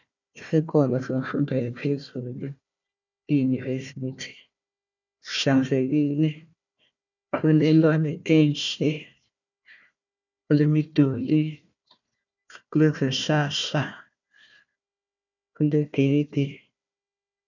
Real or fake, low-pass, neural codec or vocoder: fake; 7.2 kHz; codec, 16 kHz, 1 kbps, FunCodec, trained on Chinese and English, 50 frames a second